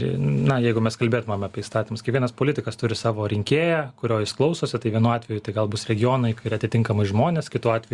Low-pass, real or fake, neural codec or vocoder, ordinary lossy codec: 10.8 kHz; real; none; Opus, 64 kbps